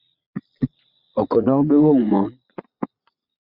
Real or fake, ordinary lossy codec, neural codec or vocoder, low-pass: fake; Opus, 64 kbps; vocoder, 22.05 kHz, 80 mel bands, Vocos; 5.4 kHz